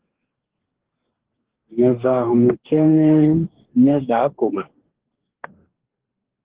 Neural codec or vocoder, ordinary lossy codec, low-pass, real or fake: codec, 44.1 kHz, 2.6 kbps, DAC; Opus, 16 kbps; 3.6 kHz; fake